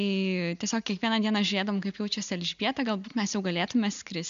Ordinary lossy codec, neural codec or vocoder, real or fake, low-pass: MP3, 64 kbps; none; real; 7.2 kHz